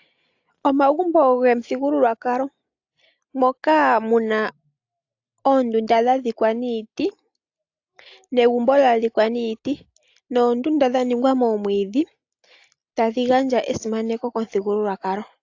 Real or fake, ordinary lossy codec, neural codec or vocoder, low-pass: real; AAC, 48 kbps; none; 7.2 kHz